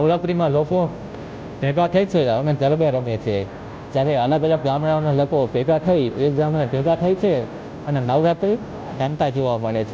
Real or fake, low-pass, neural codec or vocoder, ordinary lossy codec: fake; none; codec, 16 kHz, 0.5 kbps, FunCodec, trained on Chinese and English, 25 frames a second; none